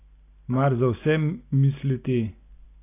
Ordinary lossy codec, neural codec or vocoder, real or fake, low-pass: AAC, 24 kbps; none; real; 3.6 kHz